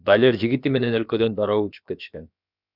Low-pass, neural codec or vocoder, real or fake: 5.4 kHz; codec, 16 kHz, about 1 kbps, DyCAST, with the encoder's durations; fake